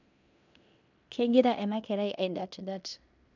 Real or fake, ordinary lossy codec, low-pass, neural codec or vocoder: fake; none; 7.2 kHz; codec, 16 kHz in and 24 kHz out, 0.9 kbps, LongCat-Audio-Codec, fine tuned four codebook decoder